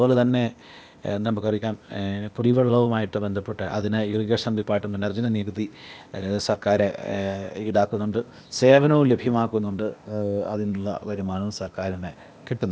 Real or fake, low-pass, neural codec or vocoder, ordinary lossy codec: fake; none; codec, 16 kHz, 0.8 kbps, ZipCodec; none